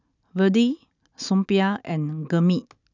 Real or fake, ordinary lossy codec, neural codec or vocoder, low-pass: real; none; none; 7.2 kHz